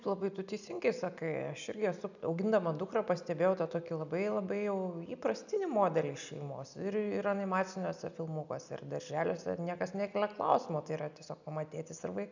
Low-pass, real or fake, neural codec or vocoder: 7.2 kHz; real; none